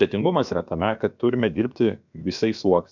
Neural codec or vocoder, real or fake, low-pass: codec, 16 kHz, about 1 kbps, DyCAST, with the encoder's durations; fake; 7.2 kHz